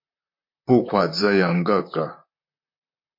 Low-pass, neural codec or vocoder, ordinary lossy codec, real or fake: 5.4 kHz; vocoder, 44.1 kHz, 128 mel bands, Pupu-Vocoder; MP3, 32 kbps; fake